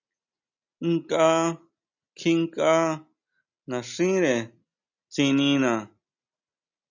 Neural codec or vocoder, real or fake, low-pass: none; real; 7.2 kHz